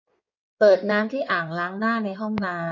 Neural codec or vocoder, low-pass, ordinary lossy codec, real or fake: codec, 16 kHz in and 24 kHz out, 2.2 kbps, FireRedTTS-2 codec; 7.2 kHz; none; fake